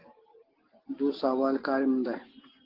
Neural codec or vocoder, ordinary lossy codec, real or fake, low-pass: none; Opus, 16 kbps; real; 5.4 kHz